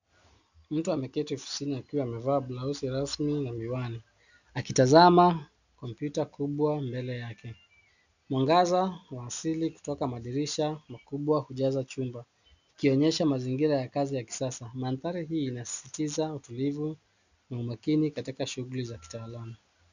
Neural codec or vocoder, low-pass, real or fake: none; 7.2 kHz; real